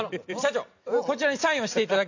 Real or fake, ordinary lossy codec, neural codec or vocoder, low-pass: real; none; none; 7.2 kHz